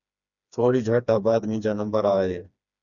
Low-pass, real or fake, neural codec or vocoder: 7.2 kHz; fake; codec, 16 kHz, 2 kbps, FreqCodec, smaller model